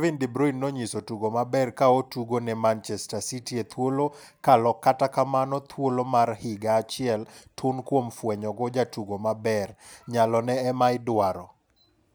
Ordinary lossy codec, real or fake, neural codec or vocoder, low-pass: none; real; none; none